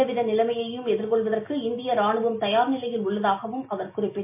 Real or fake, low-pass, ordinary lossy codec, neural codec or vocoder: real; 3.6 kHz; MP3, 24 kbps; none